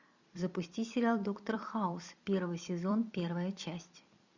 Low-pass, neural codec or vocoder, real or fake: 7.2 kHz; vocoder, 44.1 kHz, 128 mel bands every 256 samples, BigVGAN v2; fake